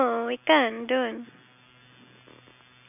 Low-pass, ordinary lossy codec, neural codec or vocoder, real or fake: 3.6 kHz; none; none; real